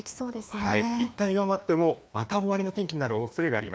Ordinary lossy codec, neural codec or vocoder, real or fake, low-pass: none; codec, 16 kHz, 2 kbps, FreqCodec, larger model; fake; none